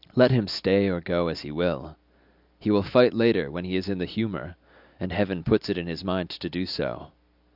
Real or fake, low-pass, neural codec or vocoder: real; 5.4 kHz; none